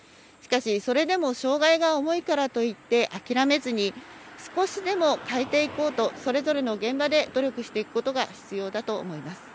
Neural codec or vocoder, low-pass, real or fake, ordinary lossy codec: none; none; real; none